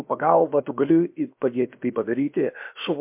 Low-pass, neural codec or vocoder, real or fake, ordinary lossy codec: 3.6 kHz; codec, 16 kHz, about 1 kbps, DyCAST, with the encoder's durations; fake; MP3, 32 kbps